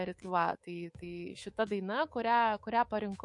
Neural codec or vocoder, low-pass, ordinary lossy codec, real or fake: codec, 24 kHz, 3.1 kbps, DualCodec; 10.8 kHz; MP3, 48 kbps; fake